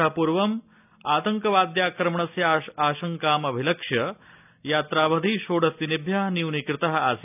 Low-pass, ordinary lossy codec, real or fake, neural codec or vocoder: 3.6 kHz; none; real; none